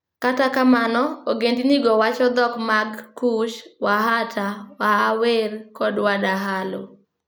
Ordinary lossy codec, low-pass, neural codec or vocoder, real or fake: none; none; none; real